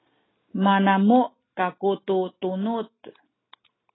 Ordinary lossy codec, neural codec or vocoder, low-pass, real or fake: AAC, 16 kbps; none; 7.2 kHz; real